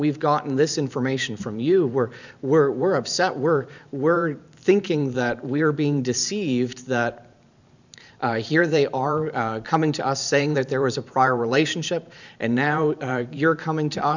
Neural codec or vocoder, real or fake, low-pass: vocoder, 44.1 kHz, 128 mel bands every 512 samples, BigVGAN v2; fake; 7.2 kHz